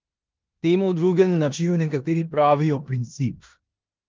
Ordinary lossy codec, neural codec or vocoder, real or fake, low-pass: Opus, 32 kbps; codec, 16 kHz in and 24 kHz out, 0.9 kbps, LongCat-Audio-Codec, four codebook decoder; fake; 7.2 kHz